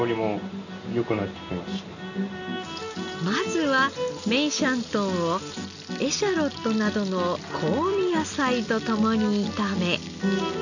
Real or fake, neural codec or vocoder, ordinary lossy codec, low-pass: real; none; none; 7.2 kHz